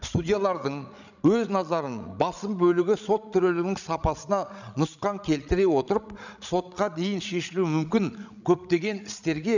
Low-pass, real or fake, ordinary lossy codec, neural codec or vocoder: 7.2 kHz; fake; none; codec, 16 kHz, 16 kbps, FreqCodec, larger model